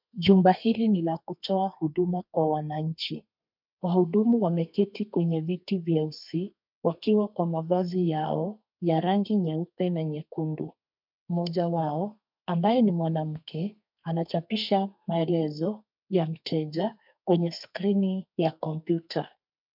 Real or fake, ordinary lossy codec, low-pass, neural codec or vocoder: fake; MP3, 48 kbps; 5.4 kHz; codec, 32 kHz, 1.9 kbps, SNAC